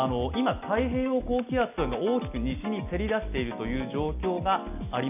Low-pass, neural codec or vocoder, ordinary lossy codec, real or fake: 3.6 kHz; none; none; real